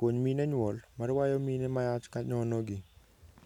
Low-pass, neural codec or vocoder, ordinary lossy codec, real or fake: 19.8 kHz; none; none; real